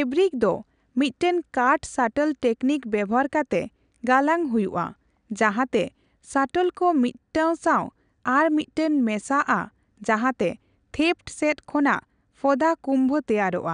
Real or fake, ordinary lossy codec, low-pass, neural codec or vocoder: real; none; 9.9 kHz; none